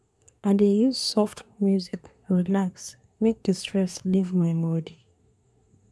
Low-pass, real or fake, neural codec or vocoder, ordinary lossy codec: none; fake; codec, 24 kHz, 1 kbps, SNAC; none